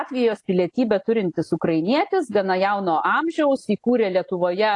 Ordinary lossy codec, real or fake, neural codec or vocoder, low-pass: AAC, 48 kbps; real; none; 10.8 kHz